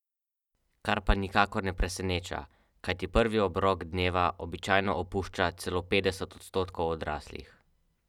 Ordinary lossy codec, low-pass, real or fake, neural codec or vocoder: none; 19.8 kHz; real; none